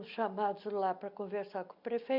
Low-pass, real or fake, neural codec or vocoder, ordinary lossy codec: 5.4 kHz; real; none; MP3, 48 kbps